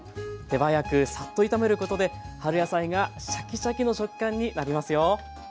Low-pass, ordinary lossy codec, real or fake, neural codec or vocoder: none; none; real; none